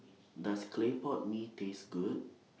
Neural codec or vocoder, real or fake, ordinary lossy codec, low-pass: none; real; none; none